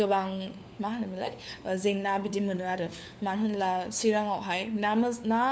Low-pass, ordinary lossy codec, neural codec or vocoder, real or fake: none; none; codec, 16 kHz, 4 kbps, FunCodec, trained on LibriTTS, 50 frames a second; fake